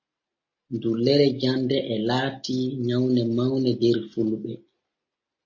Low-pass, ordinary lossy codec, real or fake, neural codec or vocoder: 7.2 kHz; MP3, 32 kbps; real; none